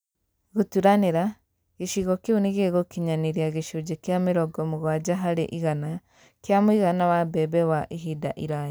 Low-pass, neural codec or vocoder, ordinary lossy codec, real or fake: none; none; none; real